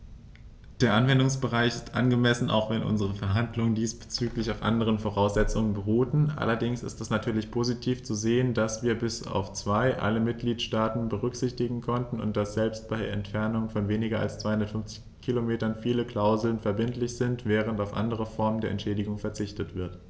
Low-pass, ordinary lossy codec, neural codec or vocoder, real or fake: none; none; none; real